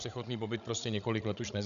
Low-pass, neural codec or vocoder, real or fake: 7.2 kHz; codec, 16 kHz, 16 kbps, FreqCodec, larger model; fake